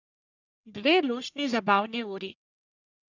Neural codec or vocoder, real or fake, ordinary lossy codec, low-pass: codec, 44.1 kHz, 1.7 kbps, Pupu-Codec; fake; none; 7.2 kHz